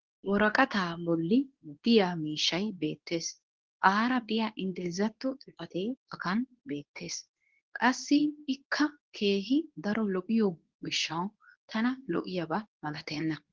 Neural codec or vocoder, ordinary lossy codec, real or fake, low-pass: codec, 24 kHz, 0.9 kbps, WavTokenizer, medium speech release version 2; Opus, 16 kbps; fake; 7.2 kHz